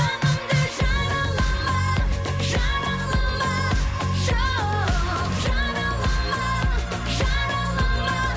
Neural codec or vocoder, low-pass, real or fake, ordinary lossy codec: none; none; real; none